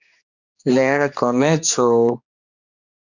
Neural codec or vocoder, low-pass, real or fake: codec, 16 kHz, 2 kbps, X-Codec, HuBERT features, trained on general audio; 7.2 kHz; fake